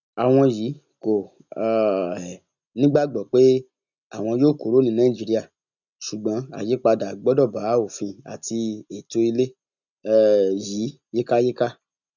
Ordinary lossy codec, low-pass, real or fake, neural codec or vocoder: none; 7.2 kHz; real; none